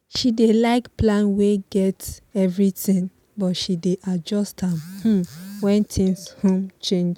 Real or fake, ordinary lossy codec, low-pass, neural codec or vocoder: real; none; 19.8 kHz; none